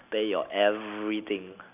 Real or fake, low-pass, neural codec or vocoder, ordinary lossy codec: real; 3.6 kHz; none; none